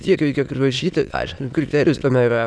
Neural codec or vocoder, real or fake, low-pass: autoencoder, 22.05 kHz, a latent of 192 numbers a frame, VITS, trained on many speakers; fake; 9.9 kHz